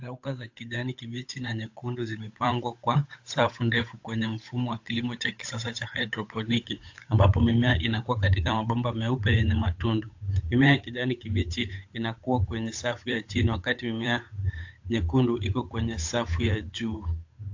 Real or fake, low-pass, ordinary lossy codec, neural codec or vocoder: fake; 7.2 kHz; AAC, 48 kbps; codec, 16 kHz, 8 kbps, FunCodec, trained on Chinese and English, 25 frames a second